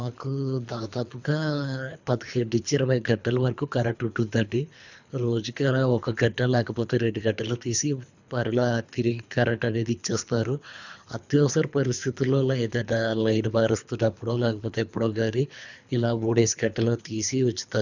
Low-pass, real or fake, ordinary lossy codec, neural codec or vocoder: 7.2 kHz; fake; none; codec, 24 kHz, 3 kbps, HILCodec